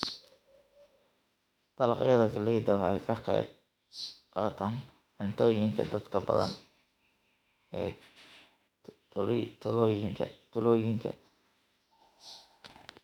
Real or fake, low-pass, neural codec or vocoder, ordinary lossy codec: fake; 19.8 kHz; autoencoder, 48 kHz, 32 numbers a frame, DAC-VAE, trained on Japanese speech; none